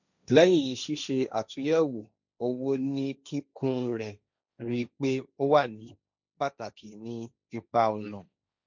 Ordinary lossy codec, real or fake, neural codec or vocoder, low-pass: none; fake; codec, 16 kHz, 1.1 kbps, Voila-Tokenizer; 7.2 kHz